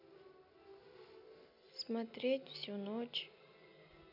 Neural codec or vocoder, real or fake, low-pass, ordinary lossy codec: none; real; 5.4 kHz; none